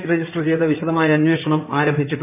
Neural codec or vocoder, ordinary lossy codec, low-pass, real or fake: codec, 16 kHz in and 24 kHz out, 2.2 kbps, FireRedTTS-2 codec; none; 3.6 kHz; fake